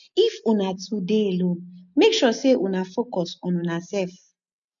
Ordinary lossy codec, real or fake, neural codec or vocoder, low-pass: none; real; none; 7.2 kHz